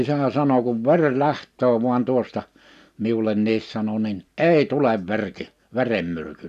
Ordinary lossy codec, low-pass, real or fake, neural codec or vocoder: none; 14.4 kHz; real; none